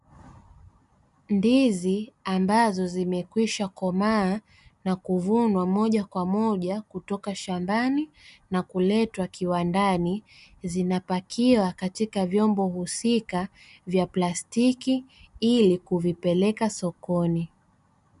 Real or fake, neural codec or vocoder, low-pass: real; none; 10.8 kHz